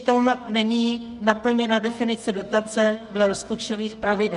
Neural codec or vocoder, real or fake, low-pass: codec, 24 kHz, 0.9 kbps, WavTokenizer, medium music audio release; fake; 10.8 kHz